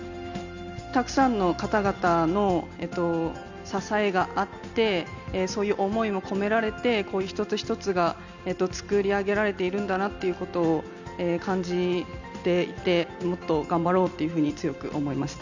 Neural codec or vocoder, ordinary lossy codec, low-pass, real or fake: none; none; 7.2 kHz; real